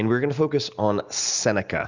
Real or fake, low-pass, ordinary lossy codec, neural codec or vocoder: real; 7.2 kHz; Opus, 64 kbps; none